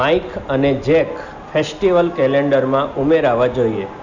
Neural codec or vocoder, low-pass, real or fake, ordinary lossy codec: none; 7.2 kHz; real; Opus, 64 kbps